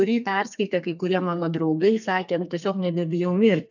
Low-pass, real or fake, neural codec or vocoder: 7.2 kHz; fake; codec, 32 kHz, 1.9 kbps, SNAC